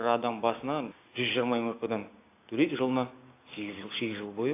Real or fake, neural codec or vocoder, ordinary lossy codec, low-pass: fake; codec, 16 kHz, 6 kbps, DAC; AAC, 24 kbps; 3.6 kHz